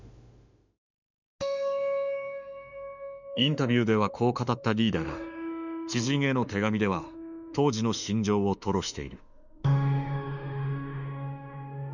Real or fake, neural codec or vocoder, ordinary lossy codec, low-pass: fake; autoencoder, 48 kHz, 32 numbers a frame, DAC-VAE, trained on Japanese speech; none; 7.2 kHz